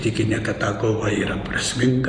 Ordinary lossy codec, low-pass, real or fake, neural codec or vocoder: AAC, 48 kbps; 9.9 kHz; real; none